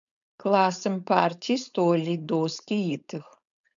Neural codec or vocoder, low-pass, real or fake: codec, 16 kHz, 4.8 kbps, FACodec; 7.2 kHz; fake